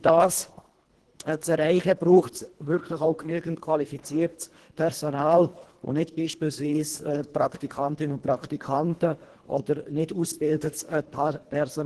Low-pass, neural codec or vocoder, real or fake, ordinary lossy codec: 10.8 kHz; codec, 24 kHz, 1.5 kbps, HILCodec; fake; Opus, 16 kbps